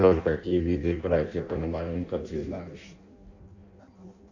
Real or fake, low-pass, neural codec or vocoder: fake; 7.2 kHz; codec, 16 kHz in and 24 kHz out, 0.6 kbps, FireRedTTS-2 codec